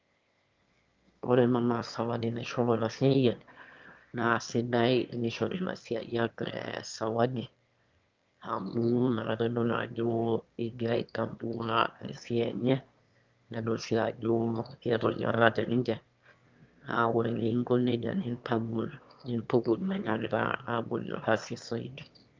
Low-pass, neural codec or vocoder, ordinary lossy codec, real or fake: 7.2 kHz; autoencoder, 22.05 kHz, a latent of 192 numbers a frame, VITS, trained on one speaker; Opus, 24 kbps; fake